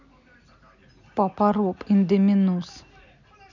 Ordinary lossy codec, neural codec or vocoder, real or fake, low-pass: none; none; real; 7.2 kHz